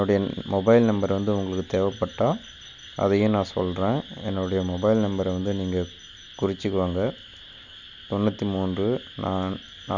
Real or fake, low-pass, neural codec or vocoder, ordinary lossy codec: real; 7.2 kHz; none; none